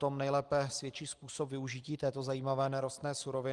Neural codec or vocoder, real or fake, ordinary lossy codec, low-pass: none; real; Opus, 24 kbps; 10.8 kHz